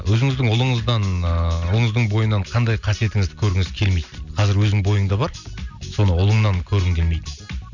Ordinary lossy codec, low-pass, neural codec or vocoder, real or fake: none; 7.2 kHz; none; real